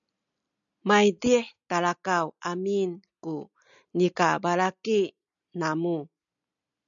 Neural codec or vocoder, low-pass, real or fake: none; 7.2 kHz; real